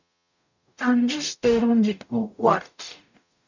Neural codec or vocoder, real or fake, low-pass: codec, 44.1 kHz, 0.9 kbps, DAC; fake; 7.2 kHz